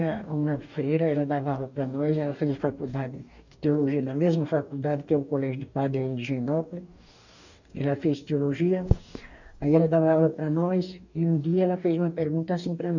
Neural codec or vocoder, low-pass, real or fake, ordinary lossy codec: codec, 44.1 kHz, 2.6 kbps, DAC; 7.2 kHz; fake; none